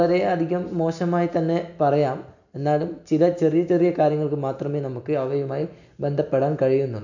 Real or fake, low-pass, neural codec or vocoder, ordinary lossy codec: real; 7.2 kHz; none; none